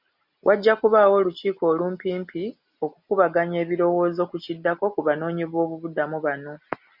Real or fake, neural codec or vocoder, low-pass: real; none; 5.4 kHz